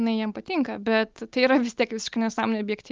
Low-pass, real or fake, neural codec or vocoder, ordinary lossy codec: 7.2 kHz; real; none; Opus, 32 kbps